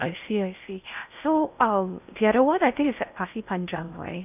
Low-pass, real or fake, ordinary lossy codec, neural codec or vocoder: 3.6 kHz; fake; none; codec, 16 kHz in and 24 kHz out, 0.6 kbps, FocalCodec, streaming, 2048 codes